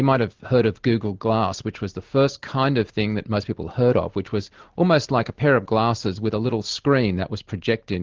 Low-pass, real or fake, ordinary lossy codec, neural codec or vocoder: 7.2 kHz; real; Opus, 16 kbps; none